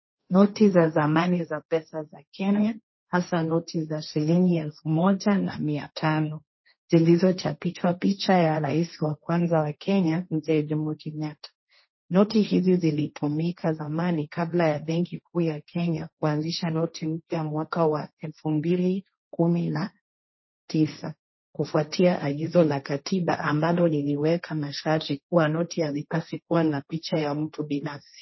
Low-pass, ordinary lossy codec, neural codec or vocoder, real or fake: 7.2 kHz; MP3, 24 kbps; codec, 16 kHz, 1.1 kbps, Voila-Tokenizer; fake